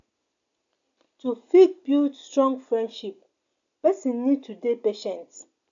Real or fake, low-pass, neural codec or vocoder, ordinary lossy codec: real; 7.2 kHz; none; AAC, 64 kbps